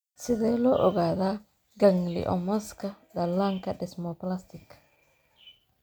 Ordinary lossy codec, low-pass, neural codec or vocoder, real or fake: none; none; none; real